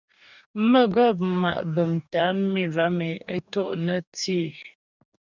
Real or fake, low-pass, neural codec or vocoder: fake; 7.2 kHz; codec, 44.1 kHz, 2.6 kbps, DAC